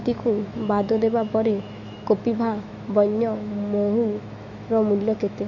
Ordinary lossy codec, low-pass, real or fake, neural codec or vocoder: none; 7.2 kHz; fake; autoencoder, 48 kHz, 128 numbers a frame, DAC-VAE, trained on Japanese speech